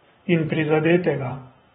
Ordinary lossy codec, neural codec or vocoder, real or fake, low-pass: AAC, 16 kbps; vocoder, 44.1 kHz, 128 mel bands every 512 samples, BigVGAN v2; fake; 19.8 kHz